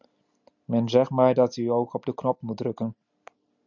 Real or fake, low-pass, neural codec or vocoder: real; 7.2 kHz; none